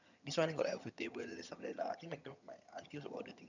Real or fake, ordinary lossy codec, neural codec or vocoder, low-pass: fake; none; vocoder, 22.05 kHz, 80 mel bands, HiFi-GAN; 7.2 kHz